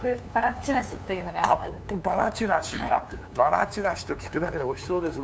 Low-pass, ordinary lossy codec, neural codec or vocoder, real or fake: none; none; codec, 16 kHz, 2 kbps, FunCodec, trained on LibriTTS, 25 frames a second; fake